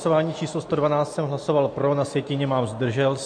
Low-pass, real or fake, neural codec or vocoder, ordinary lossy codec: 9.9 kHz; real; none; MP3, 48 kbps